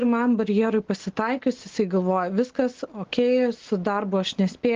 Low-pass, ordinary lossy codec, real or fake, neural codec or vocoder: 7.2 kHz; Opus, 16 kbps; real; none